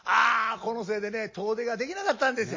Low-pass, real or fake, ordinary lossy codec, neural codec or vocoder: 7.2 kHz; real; MP3, 32 kbps; none